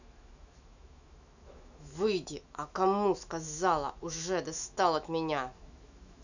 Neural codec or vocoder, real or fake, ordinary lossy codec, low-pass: autoencoder, 48 kHz, 128 numbers a frame, DAC-VAE, trained on Japanese speech; fake; none; 7.2 kHz